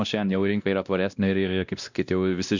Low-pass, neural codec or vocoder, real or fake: 7.2 kHz; codec, 16 kHz, 1 kbps, X-Codec, WavLM features, trained on Multilingual LibriSpeech; fake